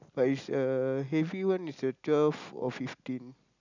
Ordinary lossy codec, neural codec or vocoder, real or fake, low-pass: none; none; real; 7.2 kHz